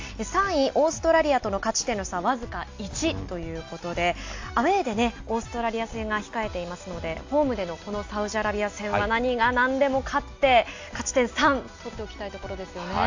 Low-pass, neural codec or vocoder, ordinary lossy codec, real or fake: 7.2 kHz; none; AAC, 48 kbps; real